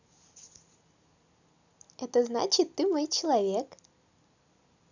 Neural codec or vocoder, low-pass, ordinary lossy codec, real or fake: none; 7.2 kHz; none; real